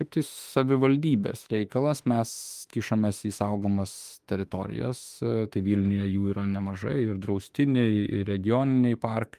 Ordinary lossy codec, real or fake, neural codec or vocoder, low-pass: Opus, 32 kbps; fake; autoencoder, 48 kHz, 32 numbers a frame, DAC-VAE, trained on Japanese speech; 14.4 kHz